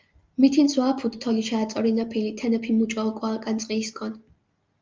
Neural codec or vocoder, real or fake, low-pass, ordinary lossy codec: none; real; 7.2 kHz; Opus, 32 kbps